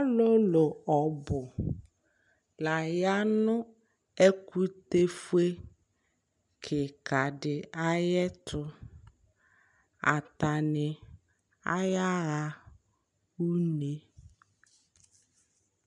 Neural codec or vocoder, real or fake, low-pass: none; real; 10.8 kHz